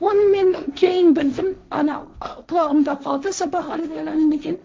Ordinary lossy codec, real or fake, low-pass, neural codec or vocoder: none; fake; none; codec, 16 kHz, 1.1 kbps, Voila-Tokenizer